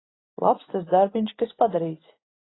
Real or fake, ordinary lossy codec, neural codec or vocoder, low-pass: real; AAC, 16 kbps; none; 7.2 kHz